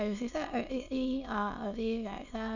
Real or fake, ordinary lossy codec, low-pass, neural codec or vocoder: fake; none; 7.2 kHz; autoencoder, 22.05 kHz, a latent of 192 numbers a frame, VITS, trained on many speakers